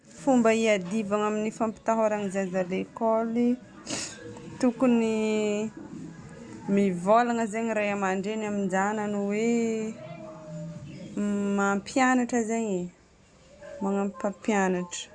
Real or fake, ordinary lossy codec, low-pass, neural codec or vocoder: real; Opus, 64 kbps; 9.9 kHz; none